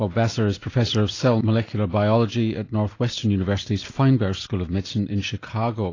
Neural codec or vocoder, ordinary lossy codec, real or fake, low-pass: none; AAC, 32 kbps; real; 7.2 kHz